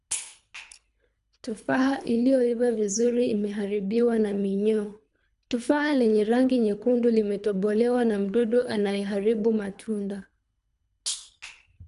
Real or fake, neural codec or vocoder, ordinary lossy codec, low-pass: fake; codec, 24 kHz, 3 kbps, HILCodec; none; 10.8 kHz